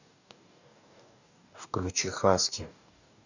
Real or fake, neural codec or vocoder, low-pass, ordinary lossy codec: fake; codec, 44.1 kHz, 2.6 kbps, DAC; 7.2 kHz; none